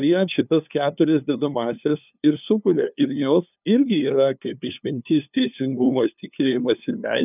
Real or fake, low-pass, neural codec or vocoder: fake; 3.6 kHz; codec, 16 kHz, 2 kbps, FunCodec, trained on LibriTTS, 25 frames a second